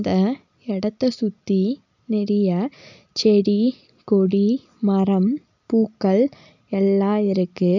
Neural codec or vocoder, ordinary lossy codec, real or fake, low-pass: none; none; real; 7.2 kHz